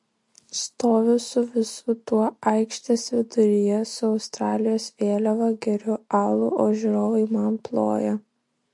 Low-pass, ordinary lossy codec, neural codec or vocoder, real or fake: 10.8 kHz; MP3, 48 kbps; none; real